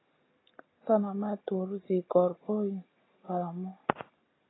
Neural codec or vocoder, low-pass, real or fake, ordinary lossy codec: none; 7.2 kHz; real; AAC, 16 kbps